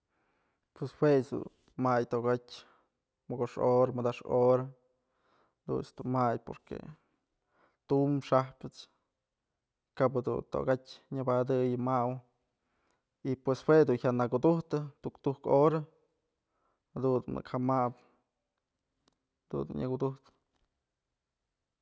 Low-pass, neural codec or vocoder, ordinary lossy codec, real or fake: none; none; none; real